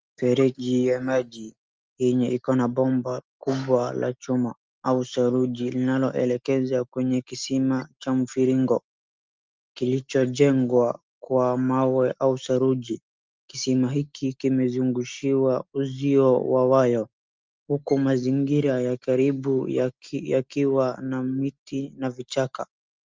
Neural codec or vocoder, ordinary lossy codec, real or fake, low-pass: none; Opus, 24 kbps; real; 7.2 kHz